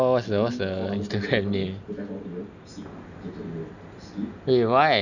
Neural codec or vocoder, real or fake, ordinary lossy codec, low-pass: none; real; none; 7.2 kHz